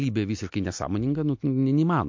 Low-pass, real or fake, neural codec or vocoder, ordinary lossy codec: 7.2 kHz; real; none; MP3, 48 kbps